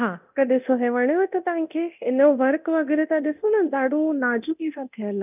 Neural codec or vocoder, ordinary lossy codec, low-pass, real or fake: codec, 24 kHz, 0.9 kbps, DualCodec; none; 3.6 kHz; fake